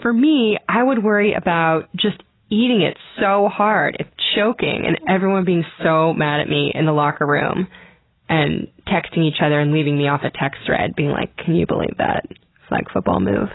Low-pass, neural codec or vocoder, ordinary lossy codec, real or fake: 7.2 kHz; none; AAC, 16 kbps; real